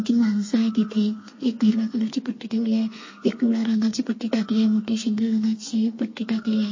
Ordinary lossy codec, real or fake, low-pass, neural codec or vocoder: MP3, 32 kbps; fake; 7.2 kHz; codec, 32 kHz, 1.9 kbps, SNAC